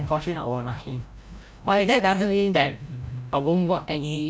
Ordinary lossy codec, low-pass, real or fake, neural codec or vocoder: none; none; fake; codec, 16 kHz, 0.5 kbps, FreqCodec, larger model